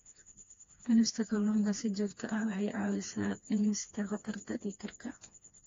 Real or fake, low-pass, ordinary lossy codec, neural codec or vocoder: fake; 7.2 kHz; AAC, 32 kbps; codec, 16 kHz, 2 kbps, FreqCodec, smaller model